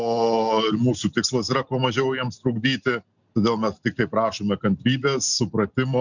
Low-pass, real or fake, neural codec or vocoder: 7.2 kHz; real; none